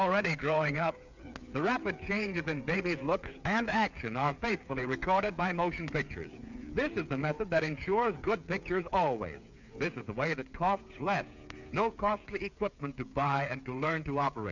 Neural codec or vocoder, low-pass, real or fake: codec, 16 kHz, 8 kbps, FreqCodec, smaller model; 7.2 kHz; fake